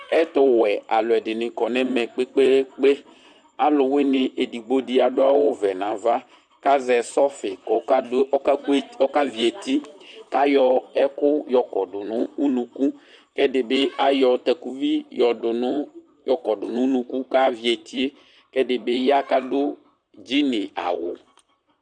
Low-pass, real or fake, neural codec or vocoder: 9.9 kHz; fake; vocoder, 44.1 kHz, 128 mel bands, Pupu-Vocoder